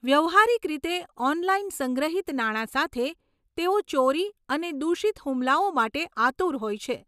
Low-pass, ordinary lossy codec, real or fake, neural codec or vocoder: 14.4 kHz; none; real; none